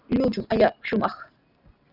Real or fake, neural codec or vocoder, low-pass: real; none; 5.4 kHz